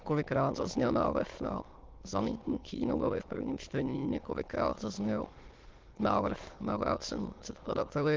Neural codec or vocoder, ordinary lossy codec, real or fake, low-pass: autoencoder, 22.05 kHz, a latent of 192 numbers a frame, VITS, trained on many speakers; Opus, 16 kbps; fake; 7.2 kHz